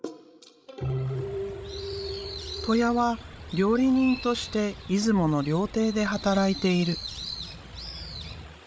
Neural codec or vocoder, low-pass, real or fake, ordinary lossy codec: codec, 16 kHz, 16 kbps, FreqCodec, larger model; none; fake; none